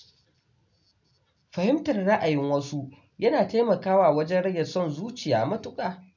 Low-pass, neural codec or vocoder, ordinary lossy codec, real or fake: 7.2 kHz; none; none; real